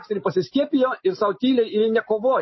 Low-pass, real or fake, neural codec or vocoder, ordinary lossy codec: 7.2 kHz; real; none; MP3, 24 kbps